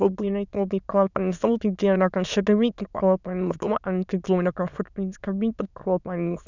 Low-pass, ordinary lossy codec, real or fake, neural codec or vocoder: 7.2 kHz; none; fake; autoencoder, 22.05 kHz, a latent of 192 numbers a frame, VITS, trained on many speakers